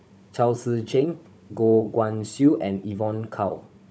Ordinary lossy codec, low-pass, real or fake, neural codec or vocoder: none; none; fake; codec, 16 kHz, 16 kbps, FunCodec, trained on Chinese and English, 50 frames a second